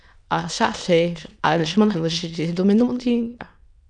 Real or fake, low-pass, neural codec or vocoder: fake; 9.9 kHz; autoencoder, 22.05 kHz, a latent of 192 numbers a frame, VITS, trained on many speakers